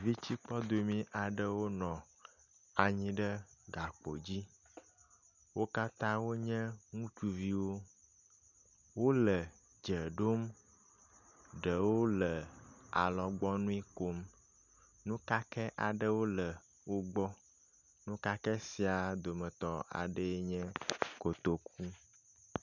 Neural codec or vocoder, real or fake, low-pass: none; real; 7.2 kHz